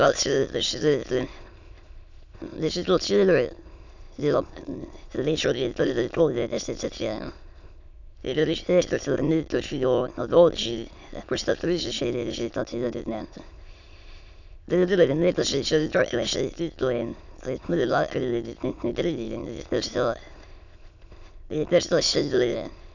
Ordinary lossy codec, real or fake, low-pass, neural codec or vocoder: none; fake; 7.2 kHz; autoencoder, 22.05 kHz, a latent of 192 numbers a frame, VITS, trained on many speakers